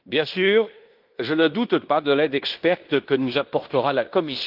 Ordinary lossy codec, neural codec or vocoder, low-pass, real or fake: Opus, 32 kbps; codec, 16 kHz in and 24 kHz out, 0.9 kbps, LongCat-Audio-Codec, fine tuned four codebook decoder; 5.4 kHz; fake